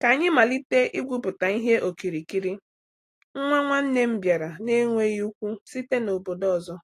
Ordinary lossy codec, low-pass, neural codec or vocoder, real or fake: AAC, 48 kbps; 14.4 kHz; none; real